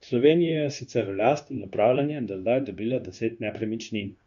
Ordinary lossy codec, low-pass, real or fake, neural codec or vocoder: none; 7.2 kHz; fake; codec, 16 kHz, 0.9 kbps, LongCat-Audio-Codec